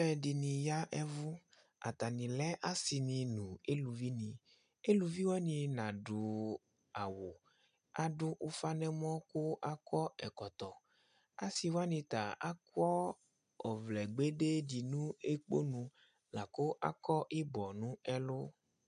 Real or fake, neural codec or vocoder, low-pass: real; none; 9.9 kHz